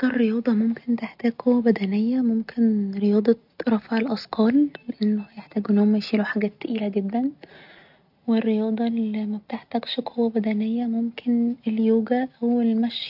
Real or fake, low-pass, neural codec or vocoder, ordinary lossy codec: real; 5.4 kHz; none; none